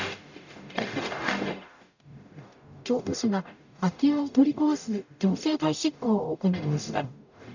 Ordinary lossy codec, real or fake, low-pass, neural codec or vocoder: none; fake; 7.2 kHz; codec, 44.1 kHz, 0.9 kbps, DAC